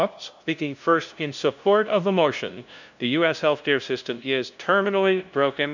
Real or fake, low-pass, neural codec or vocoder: fake; 7.2 kHz; codec, 16 kHz, 0.5 kbps, FunCodec, trained on LibriTTS, 25 frames a second